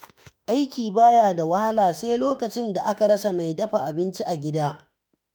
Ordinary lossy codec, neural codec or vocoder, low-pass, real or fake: none; autoencoder, 48 kHz, 32 numbers a frame, DAC-VAE, trained on Japanese speech; none; fake